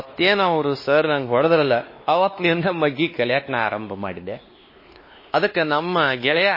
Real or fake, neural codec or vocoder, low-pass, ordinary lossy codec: fake; codec, 16 kHz, 2 kbps, X-Codec, WavLM features, trained on Multilingual LibriSpeech; 5.4 kHz; MP3, 24 kbps